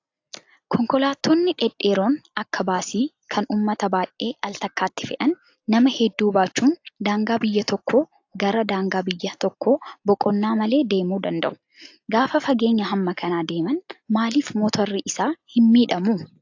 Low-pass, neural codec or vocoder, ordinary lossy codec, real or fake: 7.2 kHz; none; AAC, 48 kbps; real